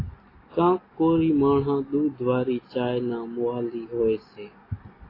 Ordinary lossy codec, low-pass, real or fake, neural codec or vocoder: AAC, 24 kbps; 5.4 kHz; real; none